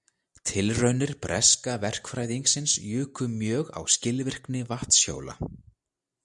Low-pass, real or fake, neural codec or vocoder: 10.8 kHz; real; none